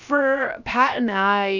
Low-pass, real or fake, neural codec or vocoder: 7.2 kHz; fake; codec, 16 kHz, about 1 kbps, DyCAST, with the encoder's durations